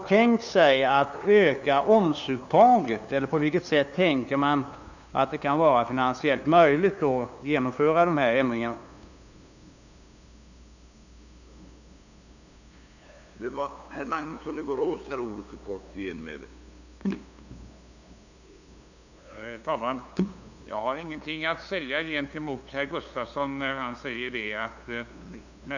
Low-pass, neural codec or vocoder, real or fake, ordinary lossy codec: 7.2 kHz; codec, 16 kHz, 2 kbps, FunCodec, trained on LibriTTS, 25 frames a second; fake; none